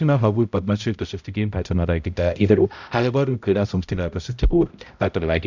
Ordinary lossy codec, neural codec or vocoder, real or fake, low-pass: none; codec, 16 kHz, 0.5 kbps, X-Codec, HuBERT features, trained on balanced general audio; fake; 7.2 kHz